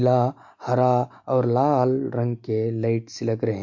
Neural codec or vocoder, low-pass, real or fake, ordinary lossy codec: none; 7.2 kHz; real; MP3, 48 kbps